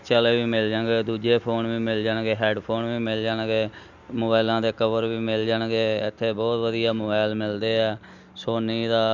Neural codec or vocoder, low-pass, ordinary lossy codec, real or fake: none; 7.2 kHz; none; real